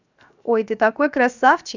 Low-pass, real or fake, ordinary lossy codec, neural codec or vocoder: 7.2 kHz; fake; none; codec, 16 kHz, 0.7 kbps, FocalCodec